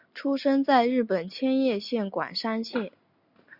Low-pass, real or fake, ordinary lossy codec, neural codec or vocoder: 5.4 kHz; real; Opus, 64 kbps; none